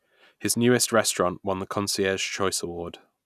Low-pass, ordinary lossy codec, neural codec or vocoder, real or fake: 14.4 kHz; none; none; real